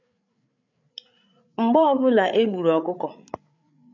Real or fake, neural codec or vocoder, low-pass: fake; codec, 16 kHz, 8 kbps, FreqCodec, larger model; 7.2 kHz